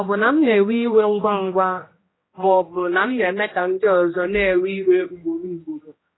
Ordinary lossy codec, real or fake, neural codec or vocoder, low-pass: AAC, 16 kbps; fake; codec, 16 kHz, 1 kbps, X-Codec, HuBERT features, trained on general audio; 7.2 kHz